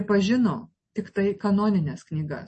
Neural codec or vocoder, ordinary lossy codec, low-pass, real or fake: none; MP3, 32 kbps; 10.8 kHz; real